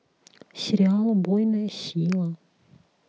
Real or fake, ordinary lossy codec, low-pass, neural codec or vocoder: real; none; none; none